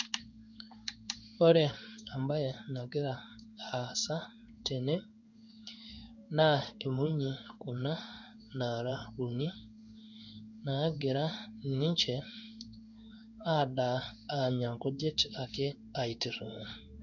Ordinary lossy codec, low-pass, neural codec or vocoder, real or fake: none; 7.2 kHz; codec, 16 kHz in and 24 kHz out, 1 kbps, XY-Tokenizer; fake